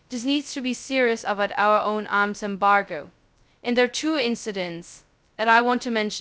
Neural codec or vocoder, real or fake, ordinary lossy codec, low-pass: codec, 16 kHz, 0.2 kbps, FocalCodec; fake; none; none